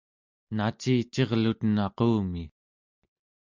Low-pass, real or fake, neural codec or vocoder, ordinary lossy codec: 7.2 kHz; real; none; MP3, 64 kbps